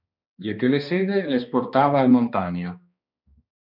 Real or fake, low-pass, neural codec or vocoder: fake; 5.4 kHz; codec, 16 kHz, 2 kbps, X-Codec, HuBERT features, trained on balanced general audio